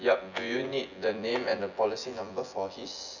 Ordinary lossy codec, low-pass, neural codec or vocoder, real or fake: none; 7.2 kHz; vocoder, 24 kHz, 100 mel bands, Vocos; fake